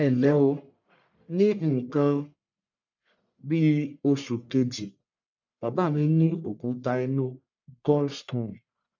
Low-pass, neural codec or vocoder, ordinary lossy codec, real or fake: 7.2 kHz; codec, 44.1 kHz, 1.7 kbps, Pupu-Codec; none; fake